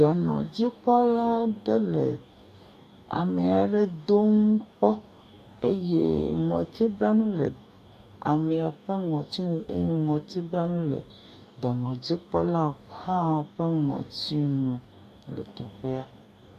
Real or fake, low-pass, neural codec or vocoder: fake; 14.4 kHz; codec, 44.1 kHz, 2.6 kbps, DAC